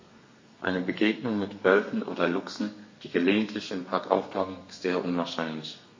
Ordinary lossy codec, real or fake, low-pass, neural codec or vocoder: MP3, 32 kbps; fake; 7.2 kHz; codec, 32 kHz, 1.9 kbps, SNAC